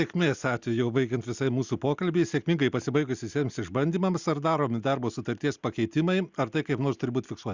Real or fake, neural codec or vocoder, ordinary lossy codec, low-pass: real; none; Opus, 64 kbps; 7.2 kHz